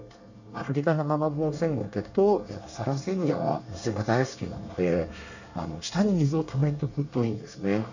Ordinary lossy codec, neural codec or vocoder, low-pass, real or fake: none; codec, 24 kHz, 1 kbps, SNAC; 7.2 kHz; fake